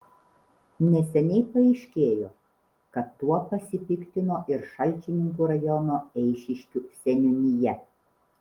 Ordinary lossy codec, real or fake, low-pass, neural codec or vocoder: Opus, 24 kbps; real; 19.8 kHz; none